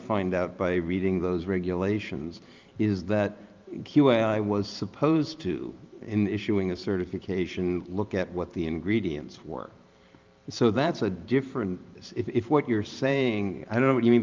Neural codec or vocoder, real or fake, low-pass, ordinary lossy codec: vocoder, 44.1 kHz, 128 mel bands every 512 samples, BigVGAN v2; fake; 7.2 kHz; Opus, 24 kbps